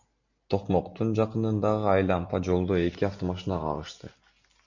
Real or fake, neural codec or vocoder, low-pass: real; none; 7.2 kHz